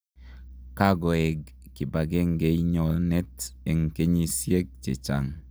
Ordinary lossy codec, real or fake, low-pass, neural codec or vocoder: none; real; none; none